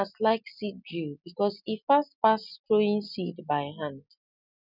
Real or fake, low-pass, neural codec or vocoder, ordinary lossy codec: real; 5.4 kHz; none; none